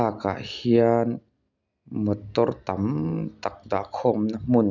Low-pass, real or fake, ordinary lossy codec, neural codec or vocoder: 7.2 kHz; real; none; none